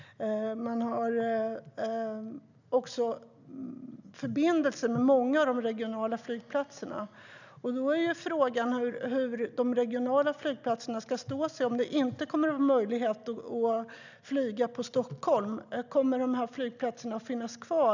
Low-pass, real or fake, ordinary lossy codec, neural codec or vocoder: 7.2 kHz; real; none; none